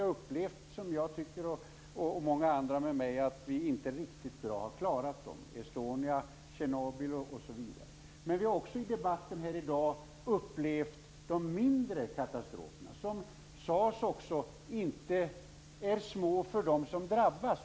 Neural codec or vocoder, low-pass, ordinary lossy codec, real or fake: none; none; none; real